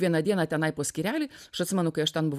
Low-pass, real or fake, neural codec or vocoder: 14.4 kHz; real; none